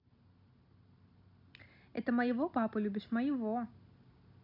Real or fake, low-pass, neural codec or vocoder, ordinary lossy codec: real; 5.4 kHz; none; none